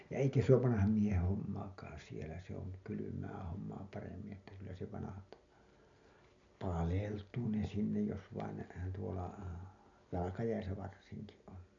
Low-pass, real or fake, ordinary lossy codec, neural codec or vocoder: 7.2 kHz; real; MP3, 64 kbps; none